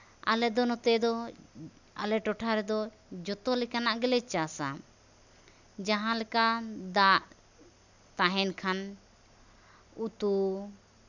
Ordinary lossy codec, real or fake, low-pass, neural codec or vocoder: none; real; 7.2 kHz; none